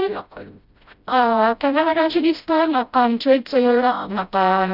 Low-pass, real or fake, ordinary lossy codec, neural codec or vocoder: 5.4 kHz; fake; none; codec, 16 kHz, 0.5 kbps, FreqCodec, smaller model